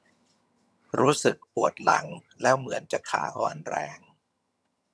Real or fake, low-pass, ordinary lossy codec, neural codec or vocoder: fake; none; none; vocoder, 22.05 kHz, 80 mel bands, HiFi-GAN